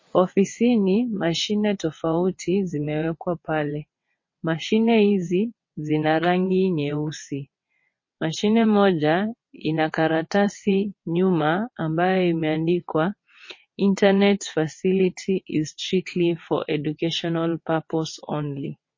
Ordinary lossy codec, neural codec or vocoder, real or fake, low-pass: MP3, 32 kbps; vocoder, 22.05 kHz, 80 mel bands, WaveNeXt; fake; 7.2 kHz